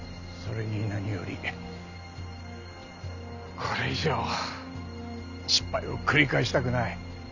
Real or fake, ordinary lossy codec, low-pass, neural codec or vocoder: real; none; 7.2 kHz; none